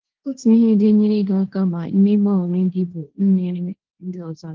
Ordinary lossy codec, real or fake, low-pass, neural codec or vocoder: Opus, 16 kbps; fake; 7.2 kHz; codec, 16 kHz, 1.1 kbps, Voila-Tokenizer